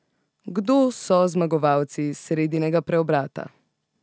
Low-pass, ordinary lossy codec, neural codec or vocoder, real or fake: none; none; none; real